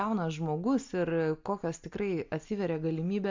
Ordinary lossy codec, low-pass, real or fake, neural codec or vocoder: MP3, 64 kbps; 7.2 kHz; real; none